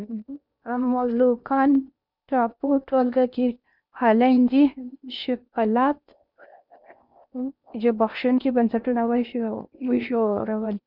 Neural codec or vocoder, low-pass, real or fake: codec, 16 kHz in and 24 kHz out, 0.8 kbps, FocalCodec, streaming, 65536 codes; 5.4 kHz; fake